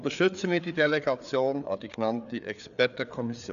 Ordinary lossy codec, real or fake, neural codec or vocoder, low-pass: none; fake; codec, 16 kHz, 4 kbps, FreqCodec, larger model; 7.2 kHz